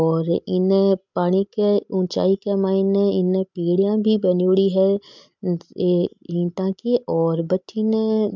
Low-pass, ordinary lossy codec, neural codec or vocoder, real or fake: 7.2 kHz; MP3, 64 kbps; none; real